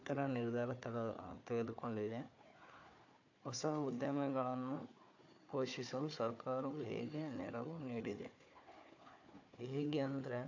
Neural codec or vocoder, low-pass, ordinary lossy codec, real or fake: codec, 16 kHz, 4 kbps, FunCodec, trained on Chinese and English, 50 frames a second; 7.2 kHz; AAC, 48 kbps; fake